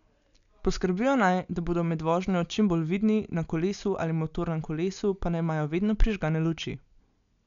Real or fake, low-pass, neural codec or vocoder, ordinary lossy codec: real; 7.2 kHz; none; none